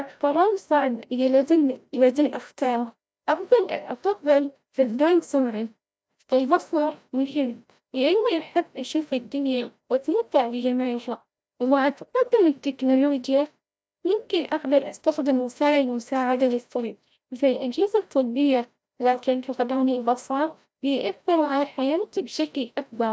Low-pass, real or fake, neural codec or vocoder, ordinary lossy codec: none; fake; codec, 16 kHz, 0.5 kbps, FreqCodec, larger model; none